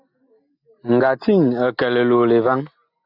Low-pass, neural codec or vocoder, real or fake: 5.4 kHz; none; real